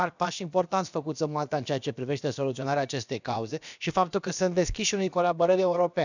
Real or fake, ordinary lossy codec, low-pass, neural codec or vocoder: fake; none; 7.2 kHz; codec, 16 kHz, about 1 kbps, DyCAST, with the encoder's durations